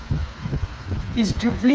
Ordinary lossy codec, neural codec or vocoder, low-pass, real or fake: none; codec, 16 kHz, 4 kbps, FreqCodec, smaller model; none; fake